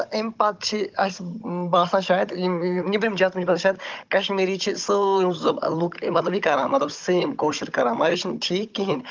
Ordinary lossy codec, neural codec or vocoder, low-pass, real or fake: Opus, 32 kbps; codec, 16 kHz, 16 kbps, FunCodec, trained on Chinese and English, 50 frames a second; 7.2 kHz; fake